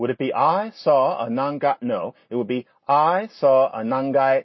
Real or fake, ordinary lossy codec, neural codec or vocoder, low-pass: real; MP3, 24 kbps; none; 7.2 kHz